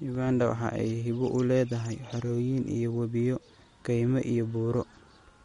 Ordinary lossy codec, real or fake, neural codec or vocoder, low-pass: MP3, 48 kbps; real; none; 10.8 kHz